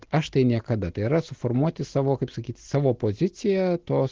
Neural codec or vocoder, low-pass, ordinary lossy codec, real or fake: none; 7.2 kHz; Opus, 16 kbps; real